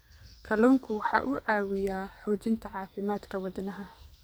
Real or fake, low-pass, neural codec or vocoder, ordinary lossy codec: fake; none; codec, 44.1 kHz, 2.6 kbps, SNAC; none